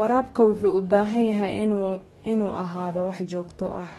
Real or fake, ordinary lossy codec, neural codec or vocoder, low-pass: fake; AAC, 32 kbps; codec, 44.1 kHz, 2.6 kbps, DAC; 19.8 kHz